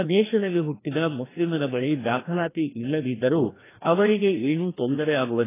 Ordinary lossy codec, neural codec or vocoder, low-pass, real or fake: AAC, 16 kbps; codec, 16 kHz, 1 kbps, FreqCodec, larger model; 3.6 kHz; fake